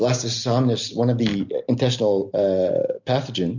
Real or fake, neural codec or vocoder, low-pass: real; none; 7.2 kHz